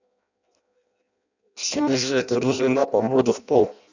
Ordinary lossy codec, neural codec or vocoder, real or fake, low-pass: none; codec, 16 kHz in and 24 kHz out, 0.6 kbps, FireRedTTS-2 codec; fake; 7.2 kHz